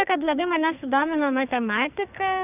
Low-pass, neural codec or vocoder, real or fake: 3.6 kHz; codec, 44.1 kHz, 2.6 kbps, SNAC; fake